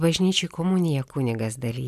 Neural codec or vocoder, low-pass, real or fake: none; 14.4 kHz; real